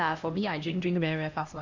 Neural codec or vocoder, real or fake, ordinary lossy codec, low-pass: codec, 16 kHz, 1 kbps, X-Codec, HuBERT features, trained on LibriSpeech; fake; AAC, 48 kbps; 7.2 kHz